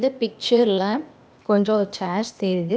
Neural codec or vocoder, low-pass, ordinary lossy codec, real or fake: codec, 16 kHz, 0.8 kbps, ZipCodec; none; none; fake